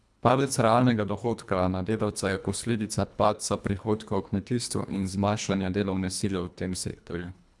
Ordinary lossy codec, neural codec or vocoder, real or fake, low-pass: none; codec, 24 kHz, 1.5 kbps, HILCodec; fake; none